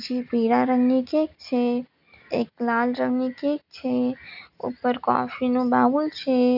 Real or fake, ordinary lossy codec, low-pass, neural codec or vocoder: real; none; 5.4 kHz; none